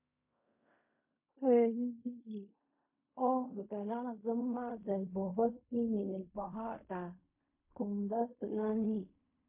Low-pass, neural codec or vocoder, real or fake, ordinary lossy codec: 3.6 kHz; codec, 16 kHz in and 24 kHz out, 0.4 kbps, LongCat-Audio-Codec, fine tuned four codebook decoder; fake; MP3, 24 kbps